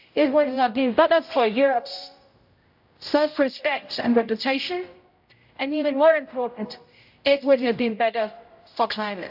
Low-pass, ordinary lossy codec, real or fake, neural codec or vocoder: 5.4 kHz; AAC, 48 kbps; fake; codec, 16 kHz, 0.5 kbps, X-Codec, HuBERT features, trained on general audio